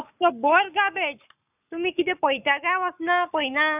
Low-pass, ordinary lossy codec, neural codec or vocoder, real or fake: 3.6 kHz; none; codec, 44.1 kHz, 7.8 kbps, Pupu-Codec; fake